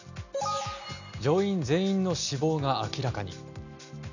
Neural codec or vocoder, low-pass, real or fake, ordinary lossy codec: none; 7.2 kHz; real; none